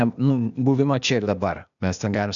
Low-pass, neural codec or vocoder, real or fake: 7.2 kHz; codec, 16 kHz, 0.8 kbps, ZipCodec; fake